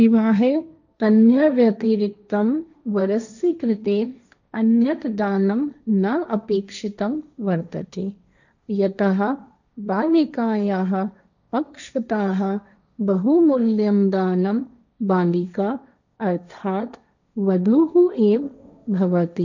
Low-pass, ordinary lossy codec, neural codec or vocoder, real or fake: 7.2 kHz; none; codec, 16 kHz, 1.1 kbps, Voila-Tokenizer; fake